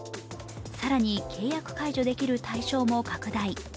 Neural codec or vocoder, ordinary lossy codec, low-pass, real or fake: none; none; none; real